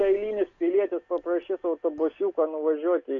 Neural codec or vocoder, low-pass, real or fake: none; 7.2 kHz; real